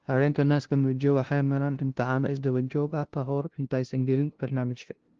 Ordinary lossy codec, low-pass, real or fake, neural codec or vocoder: Opus, 16 kbps; 7.2 kHz; fake; codec, 16 kHz, 0.5 kbps, FunCodec, trained on LibriTTS, 25 frames a second